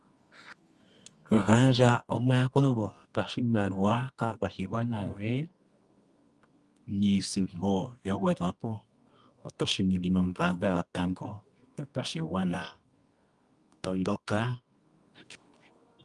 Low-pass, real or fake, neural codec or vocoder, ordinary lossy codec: 10.8 kHz; fake; codec, 24 kHz, 0.9 kbps, WavTokenizer, medium music audio release; Opus, 32 kbps